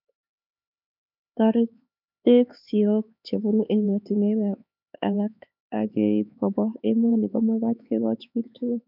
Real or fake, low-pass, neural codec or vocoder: fake; 5.4 kHz; codec, 16 kHz, 4 kbps, X-Codec, HuBERT features, trained on LibriSpeech